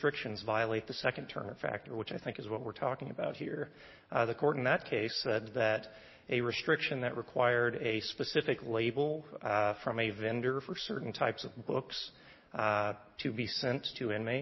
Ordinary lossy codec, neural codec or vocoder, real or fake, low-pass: MP3, 24 kbps; none; real; 7.2 kHz